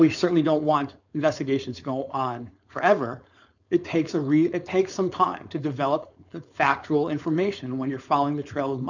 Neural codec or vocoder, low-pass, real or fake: codec, 16 kHz, 4.8 kbps, FACodec; 7.2 kHz; fake